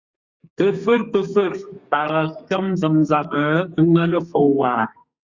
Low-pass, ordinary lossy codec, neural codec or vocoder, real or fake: 7.2 kHz; Opus, 64 kbps; codec, 32 kHz, 1.9 kbps, SNAC; fake